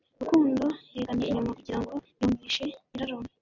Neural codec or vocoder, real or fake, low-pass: none; real; 7.2 kHz